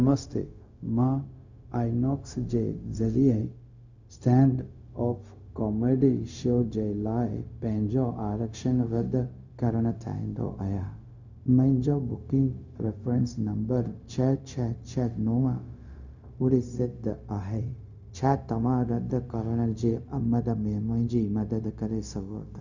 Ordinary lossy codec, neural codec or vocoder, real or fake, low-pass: none; codec, 16 kHz, 0.4 kbps, LongCat-Audio-Codec; fake; 7.2 kHz